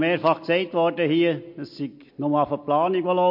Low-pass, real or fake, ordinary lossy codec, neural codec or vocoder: 5.4 kHz; real; MP3, 32 kbps; none